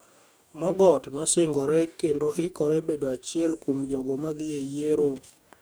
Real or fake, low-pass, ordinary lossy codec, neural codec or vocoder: fake; none; none; codec, 44.1 kHz, 2.6 kbps, DAC